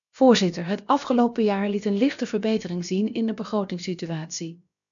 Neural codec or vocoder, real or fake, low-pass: codec, 16 kHz, about 1 kbps, DyCAST, with the encoder's durations; fake; 7.2 kHz